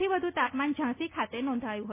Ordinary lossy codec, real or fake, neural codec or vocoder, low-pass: none; real; none; 3.6 kHz